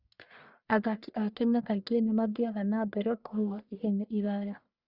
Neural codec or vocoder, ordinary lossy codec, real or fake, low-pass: codec, 44.1 kHz, 1.7 kbps, Pupu-Codec; Opus, 64 kbps; fake; 5.4 kHz